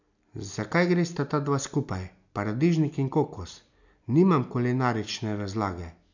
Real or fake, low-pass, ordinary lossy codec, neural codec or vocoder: real; 7.2 kHz; none; none